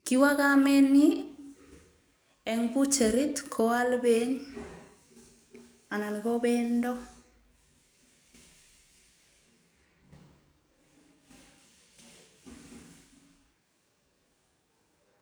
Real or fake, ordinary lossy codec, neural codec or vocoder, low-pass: fake; none; codec, 44.1 kHz, 7.8 kbps, DAC; none